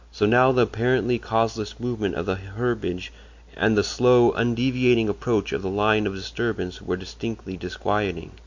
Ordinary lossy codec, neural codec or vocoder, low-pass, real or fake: MP3, 48 kbps; none; 7.2 kHz; real